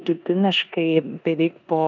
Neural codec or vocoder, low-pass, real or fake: codec, 16 kHz in and 24 kHz out, 0.9 kbps, LongCat-Audio-Codec, four codebook decoder; 7.2 kHz; fake